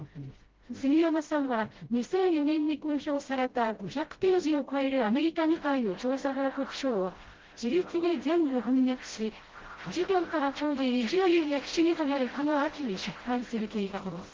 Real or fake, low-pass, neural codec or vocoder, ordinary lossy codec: fake; 7.2 kHz; codec, 16 kHz, 0.5 kbps, FreqCodec, smaller model; Opus, 16 kbps